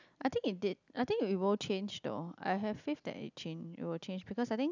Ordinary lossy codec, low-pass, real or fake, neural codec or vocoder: none; 7.2 kHz; real; none